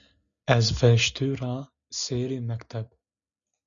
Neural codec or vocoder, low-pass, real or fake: none; 7.2 kHz; real